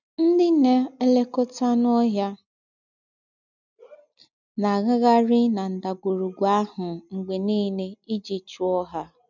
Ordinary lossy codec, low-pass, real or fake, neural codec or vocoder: none; 7.2 kHz; real; none